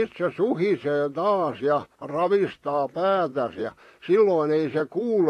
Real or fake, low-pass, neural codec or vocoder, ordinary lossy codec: fake; 14.4 kHz; vocoder, 44.1 kHz, 128 mel bands, Pupu-Vocoder; AAC, 48 kbps